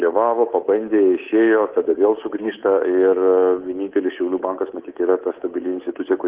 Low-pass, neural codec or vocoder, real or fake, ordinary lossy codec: 3.6 kHz; codec, 24 kHz, 3.1 kbps, DualCodec; fake; Opus, 16 kbps